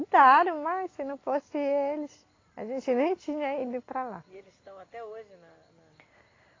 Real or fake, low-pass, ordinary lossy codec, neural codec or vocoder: real; 7.2 kHz; AAC, 32 kbps; none